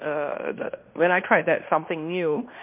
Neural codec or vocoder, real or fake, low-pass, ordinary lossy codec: codec, 16 kHz in and 24 kHz out, 0.9 kbps, LongCat-Audio-Codec, fine tuned four codebook decoder; fake; 3.6 kHz; MP3, 32 kbps